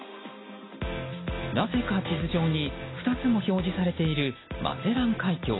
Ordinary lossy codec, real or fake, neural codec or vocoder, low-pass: AAC, 16 kbps; real; none; 7.2 kHz